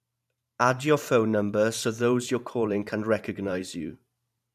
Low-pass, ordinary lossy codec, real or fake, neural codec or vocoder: 14.4 kHz; none; fake; vocoder, 44.1 kHz, 128 mel bands every 512 samples, BigVGAN v2